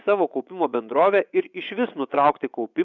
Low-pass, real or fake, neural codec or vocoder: 7.2 kHz; fake; vocoder, 24 kHz, 100 mel bands, Vocos